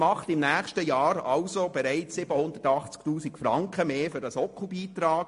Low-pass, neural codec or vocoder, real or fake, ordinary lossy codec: 10.8 kHz; none; real; MP3, 48 kbps